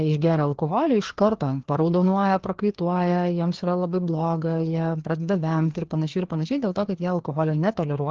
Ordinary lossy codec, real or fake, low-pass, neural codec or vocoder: Opus, 16 kbps; fake; 7.2 kHz; codec, 16 kHz, 2 kbps, FreqCodec, larger model